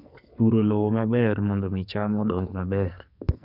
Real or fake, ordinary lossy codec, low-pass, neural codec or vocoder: fake; none; 5.4 kHz; codec, 44.1 kHz, 2.6 kbps, SNAC